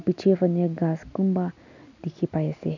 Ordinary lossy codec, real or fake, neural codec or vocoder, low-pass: none; real; none; 7.2 kHz